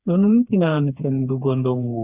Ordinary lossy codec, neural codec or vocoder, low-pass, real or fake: Opus, 64 kbps; codec, 16 kHz, 4 kbps, FreqCodec, smaller model; 3.6 kHz; fake